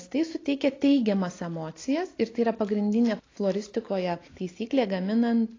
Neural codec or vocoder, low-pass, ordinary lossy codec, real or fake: none; 7.2 kHz; AAC, 32 kbps; real